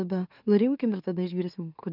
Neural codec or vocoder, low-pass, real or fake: autoencoder, 44.1 kHz, a latent of 192 numbers a frame, MeloTTS; 5.4 kHz; fake